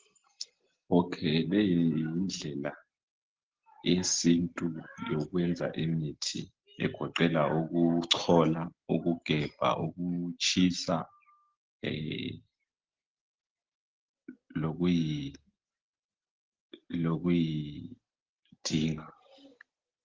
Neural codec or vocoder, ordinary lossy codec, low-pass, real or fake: none; Opus, 16 kbps; 7.2 kHz; real